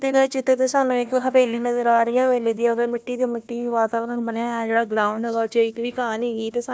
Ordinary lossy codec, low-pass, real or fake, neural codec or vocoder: none; none; fake; codec, 16 kHz, 1 kbps, FunCodec, trained on Chinese and English, 50 frames a second